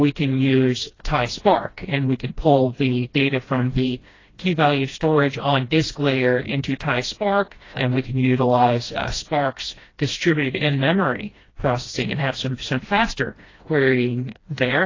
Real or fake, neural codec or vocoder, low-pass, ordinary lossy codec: fake; codec, 16 kHz, 1 kbps, FreqCodec, smaller model; 7.2 kHz; AAC, 32 kbps